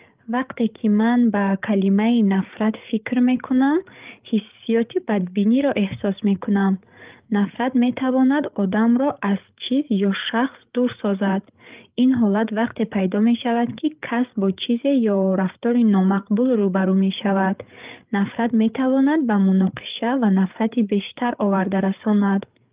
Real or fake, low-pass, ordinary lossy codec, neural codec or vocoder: fake; 3.6 kHz; Opus, 32 kbps; codec, 16 kHz, 8 kbps, FreqCodec, larger model